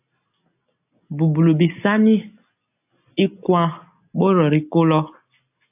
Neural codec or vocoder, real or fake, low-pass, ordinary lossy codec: none; real; 3.6 kHz; AAC, 32 kbps